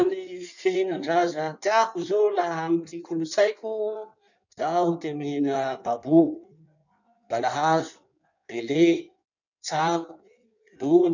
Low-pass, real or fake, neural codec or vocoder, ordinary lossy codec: 7.2 kHz; fake; codec, 16 kHz in and 24 kHz out, 1.1 kbps, FireRedTTS-2 codec; none